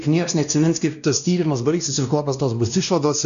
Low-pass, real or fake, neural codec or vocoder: 7.2 kHz; fake; codec, 16 kHz, 1 kbps, X-Codec, WavLM features, trained on Multilingual LibriSpeech